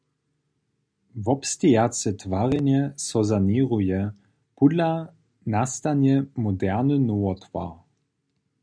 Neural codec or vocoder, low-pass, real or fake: none; 9.9 kHz; real